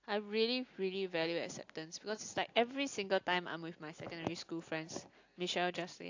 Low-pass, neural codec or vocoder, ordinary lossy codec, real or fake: 7.2 kHz; none; AAC, 48 kbps; real